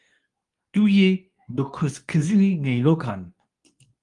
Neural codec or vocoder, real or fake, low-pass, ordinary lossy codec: codec, 24 kHz, 0.9 kbps, WavTokenizer, medium speech release version 2; fake; 10.8 kHz; Opus, 32 kbps